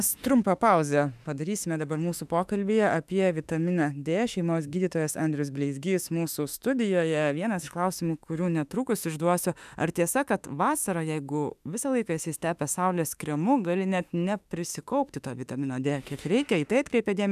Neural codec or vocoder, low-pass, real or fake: autoencoder, 48 kHz, 32 numbers a frame, DAC-VAE, trained on Japanese speech; 14.4 kHz; fake